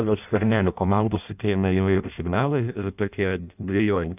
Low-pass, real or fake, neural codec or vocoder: 3.6 kHz; fake; codec, 16 kHz in and 24 kHz out, 0.6 kbps, FireRedTTS-2 codec